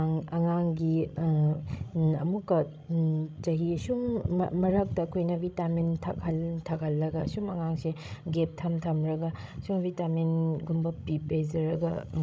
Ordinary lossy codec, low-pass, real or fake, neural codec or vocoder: none; none; fake; codec, 16 kHz, 16 kbps, FreqCodec, larger model